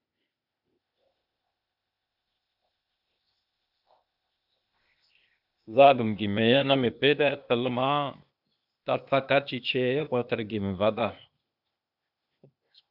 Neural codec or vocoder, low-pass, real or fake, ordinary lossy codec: codec, 16 kHz, 0.8 kbps, ZipCodec; 5.4 kHz; fake; AAC, 48 kbps